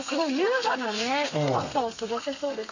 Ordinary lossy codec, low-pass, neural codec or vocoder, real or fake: none; 7.2 kHz; codec, 32 kHz, 1.9 kbps, SNAC; fake